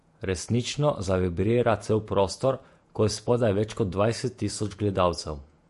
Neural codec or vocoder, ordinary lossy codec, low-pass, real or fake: vocoder, 48 kHz, 128 mel bands, Vocos; MP3, 48 kbps; 14.4 kHz; fake